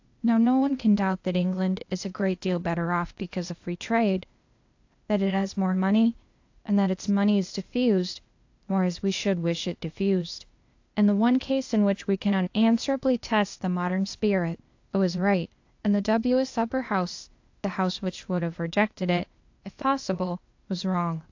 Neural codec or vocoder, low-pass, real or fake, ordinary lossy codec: codec, 16 kHz, 0.8 kbps, ZipCodec; 7.2 kHz; fake; AAC, 48 kbps